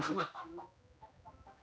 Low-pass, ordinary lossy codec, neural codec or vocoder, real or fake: none; none; codec, 16 kHz, 1 kbps, X-Codec, HuBERT features, trained on general audio; fake